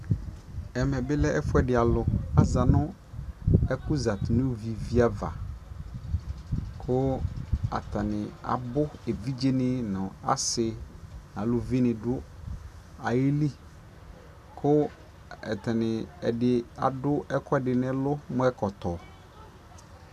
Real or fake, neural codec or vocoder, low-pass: real; none; 14.4 kHz